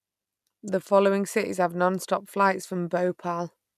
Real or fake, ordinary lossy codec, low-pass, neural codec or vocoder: real; none; 14.4 kHz; none